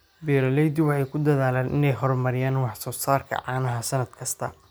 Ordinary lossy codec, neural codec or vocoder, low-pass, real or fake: none; none; none; real